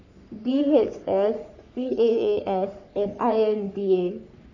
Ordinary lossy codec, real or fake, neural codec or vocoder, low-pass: none; fake; codec, 44.1 kHz, 3.4 kbps, Pupu-Codec; 7.2 kHz